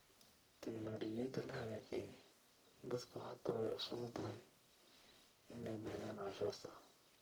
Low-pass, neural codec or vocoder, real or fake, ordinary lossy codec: none; codec, 44.1 kHz, 1.7 kbps, Pupu-Codec; fake; none